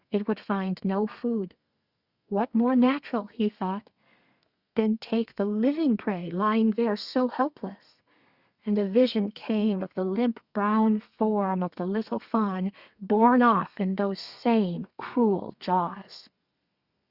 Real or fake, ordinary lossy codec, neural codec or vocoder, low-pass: fake; Opus, 64 kbps; codec, 32 kHz, 1.9 kbps, SNAC; 5.4 kHz